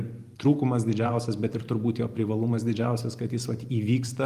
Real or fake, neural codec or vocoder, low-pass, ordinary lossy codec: fake; vocoder, 44.1 kHz, 128 mel bands every 512 samples, BigVGAN v2; 14.4 kHz; Opus, 32 kbps